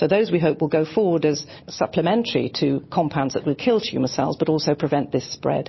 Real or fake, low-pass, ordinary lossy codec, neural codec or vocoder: real; 7.2 kHz; MP3, 24 kbps; none